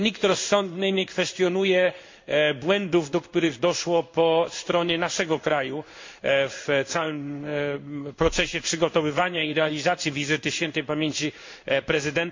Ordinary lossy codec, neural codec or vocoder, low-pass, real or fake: MP3, 48 kbps; codec, 16 kHz in and 24 kHz out, 1 kbps, XY-Tokenizer; 7.2 kHz; fake